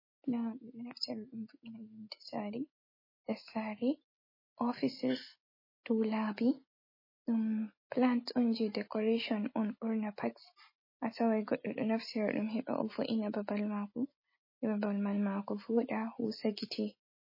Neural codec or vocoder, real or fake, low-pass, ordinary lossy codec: none; real; 5.4 kHz; MP3, 24 kbps